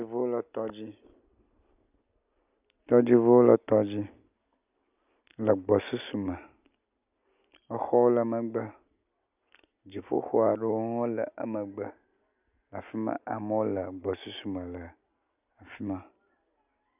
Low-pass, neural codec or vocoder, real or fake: 3.6 kHz; none; real